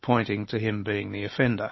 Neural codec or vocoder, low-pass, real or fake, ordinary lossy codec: none; 7.2 kHz; real; MP3, 24 kbps